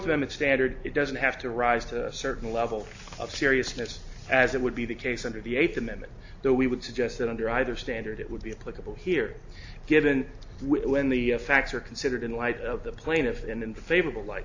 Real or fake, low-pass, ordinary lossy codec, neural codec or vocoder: real; 7.2 kHz; AAC, 48 kbps; none